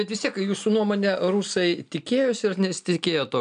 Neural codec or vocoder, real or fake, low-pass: none; real; 9.9 kHz